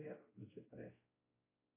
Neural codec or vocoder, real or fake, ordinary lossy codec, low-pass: codec, 16 kHz, 0.5 kbps, X-Codec, WavLM features, trained on Multilingual LibriSpeech; fake; AAC, 16 kbps; 3.6 kHz